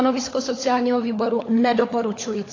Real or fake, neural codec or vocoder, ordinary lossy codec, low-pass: fake; codec, 16 kHz, 16 kbps, FunCodec, trained on LibriTTS, 50 frames a second; AAC, 32 kbps; 7.2 kHz